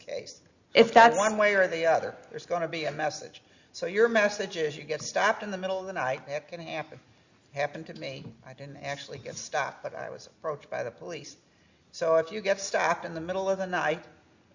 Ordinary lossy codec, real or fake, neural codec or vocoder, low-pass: Opus, 64 kbps; real; none; 7.2 kHz